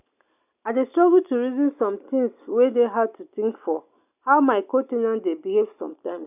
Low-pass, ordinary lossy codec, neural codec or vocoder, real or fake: 3.6 kHz; none; none; real